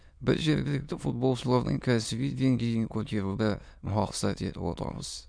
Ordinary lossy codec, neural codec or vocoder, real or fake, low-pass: none; autoencoder, 22.05 kHz, a latent of 192 numbers a frame, VITS, trained on many speakers; fake; 9.9 kHz